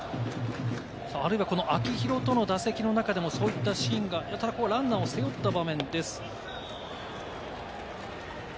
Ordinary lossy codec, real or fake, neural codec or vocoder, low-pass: none; real; none; none